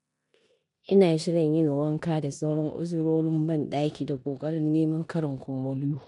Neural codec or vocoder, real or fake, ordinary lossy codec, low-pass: codec, 16 kHz in and 24 kHz out, 0.9 kbps, LongCat-Audio-Codec, four codebook decoder; fake; none; 10.8 kHz